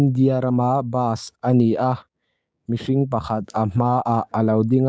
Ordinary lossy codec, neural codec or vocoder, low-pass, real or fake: none; codec, 16 kHz, 6 kbps, DAC; none; fake